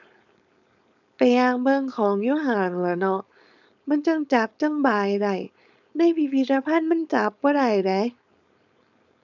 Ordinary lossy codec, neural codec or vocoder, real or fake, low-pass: none; codec, 16 kHz, 4.8 kbps, FACodec; fake; 7.2 kHz